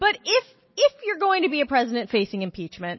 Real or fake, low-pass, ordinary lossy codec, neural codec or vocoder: real; 7.2 kHz; MP3, 24 kbps; none